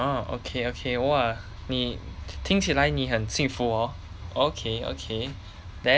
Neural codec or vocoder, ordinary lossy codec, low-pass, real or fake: none; none; none; real